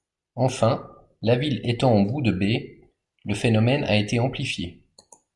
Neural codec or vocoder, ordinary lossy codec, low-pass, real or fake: none; MP3, 96 kbps; 10.8 kHz; real